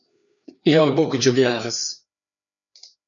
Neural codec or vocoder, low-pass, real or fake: codec, 16 kHz, 2 kbps, FreqCodec, larger model; 7.2 kHz; fake